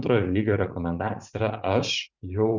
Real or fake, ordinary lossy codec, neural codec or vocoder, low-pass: fake; Opus, 64 kbps; vocoder, 44.1 kHz, 80 mel bands, Vocos; 7.2 kHz